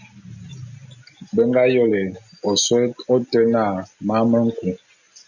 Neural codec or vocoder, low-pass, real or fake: none; 7.2 kHz; real